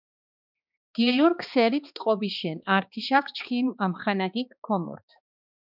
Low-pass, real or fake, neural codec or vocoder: 5.4 kHz; fake; codec, 16 kHz, 2 kbps, X-Codec, HuBERT features, trained on balanced general audio